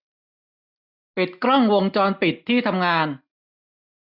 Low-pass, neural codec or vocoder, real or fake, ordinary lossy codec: 5.4 kHz; none; real; none